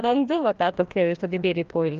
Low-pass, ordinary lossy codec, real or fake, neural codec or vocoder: 7.2 kHz; Opus, 32 kbps; fake; codec, 16 kHz, 1 kbps, FreqCodec, larger model